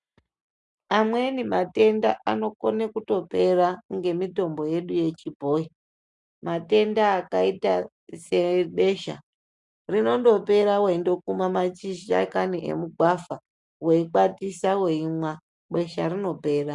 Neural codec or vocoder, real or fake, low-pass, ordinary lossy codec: none; real; 10.8 kHz; MP3, 96 kbps